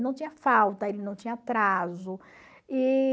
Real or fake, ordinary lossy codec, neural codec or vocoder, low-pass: real; none; none; none